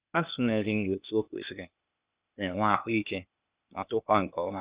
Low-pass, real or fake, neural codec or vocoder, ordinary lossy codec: 3.6 kHz; fake; codec, 16 kHz, 0.8 kbps, ZipCodec; Opus, 64 kbps